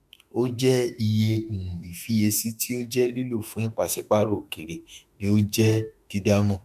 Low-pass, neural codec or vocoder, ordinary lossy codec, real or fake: 14.4 kHz; autoencoder, 48 kHz, 32 numbers a frame, DAC-VAE, trained on Japanese speech; none; fake